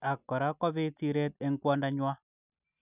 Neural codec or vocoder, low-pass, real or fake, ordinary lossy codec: none; 3.6 kHz; real; none